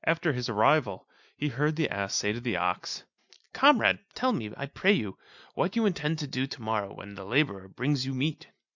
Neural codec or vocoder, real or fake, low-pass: none; real; 7.2 kHz